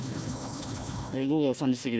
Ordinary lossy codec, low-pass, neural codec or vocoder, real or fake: none; none; codec, 16 kHz, 1 kbps, FunCodec, trained on Chinese and English, 50 frames a second; fake